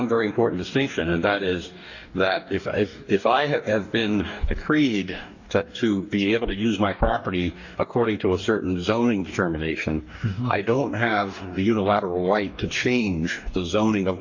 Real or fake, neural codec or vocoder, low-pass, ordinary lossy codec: fake; codec, 44.1 kHz, 2.6 kbps, DAC; 7.2 kHz; AAC, 48 kbps